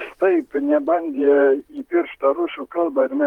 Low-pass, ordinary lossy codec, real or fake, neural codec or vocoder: 19.8 kHz; Opus, 32 kbps; fake; vocoder, 44.1 kHz, 128 mel bands, Pupu-Vocoder